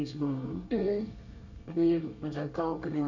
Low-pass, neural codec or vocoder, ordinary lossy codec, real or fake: 7.2 kHz; codec, 24 kHz, 1 kbps, SNAC; none; fake